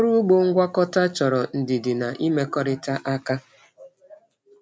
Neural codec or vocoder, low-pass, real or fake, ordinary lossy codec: none; none; real; none